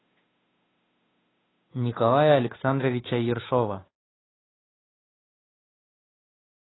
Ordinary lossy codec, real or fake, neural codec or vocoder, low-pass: AAC, 16 kbps; fake; codec, 16 kHz, 2 kbps, FunCodec, trained on Chinese and English, 25 frames a second; 7.2 kHz